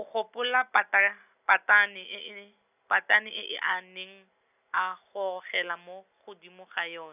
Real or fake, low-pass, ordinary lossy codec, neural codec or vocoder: real; 3.6 kHz; none; none